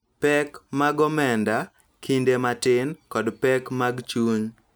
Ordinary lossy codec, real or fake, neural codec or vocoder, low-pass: none; real; none; none